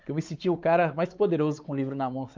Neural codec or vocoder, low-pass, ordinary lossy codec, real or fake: codec, 16 kHz, 4 kbps, X-Codec, WavLM features, trained on Multilingual LibriSpeech; 7.2 kHz; Opus, 24 kbps; fake